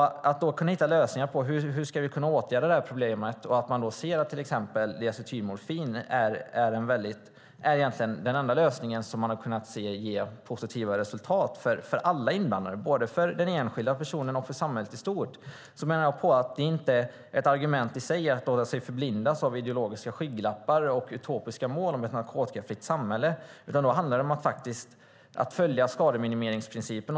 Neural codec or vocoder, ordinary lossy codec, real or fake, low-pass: none; none; real; none